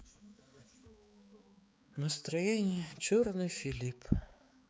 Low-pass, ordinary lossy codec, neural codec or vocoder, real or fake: none; none; codec, 16 kHz, 4 kbps, X-Codec, HuBERT features, trained on balanced general audio; fake